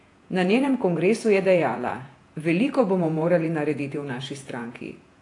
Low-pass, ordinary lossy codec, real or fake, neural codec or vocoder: 10.8 kHz; AAC, 48 kbps; fake; vocoder, 48 kHz, 128 mel bands, Vocos